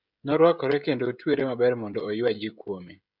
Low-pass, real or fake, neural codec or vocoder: 5.4 kHz; fake; codec, 16 kHz, 16 kbps, FreqCodec, smaller model